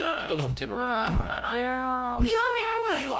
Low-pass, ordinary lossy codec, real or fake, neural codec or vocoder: none; none; fake; codec, 16 kHz, 0.5 kbps, FunCodec, trained on LibriTTS, 25 frames a second